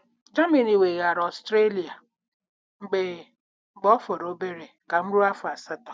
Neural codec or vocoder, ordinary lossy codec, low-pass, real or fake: none; none; none; real